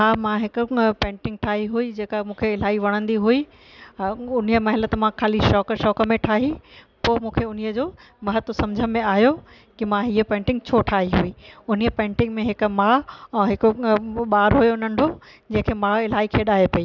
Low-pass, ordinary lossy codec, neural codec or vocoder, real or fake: 7.2 kHz; none; none; real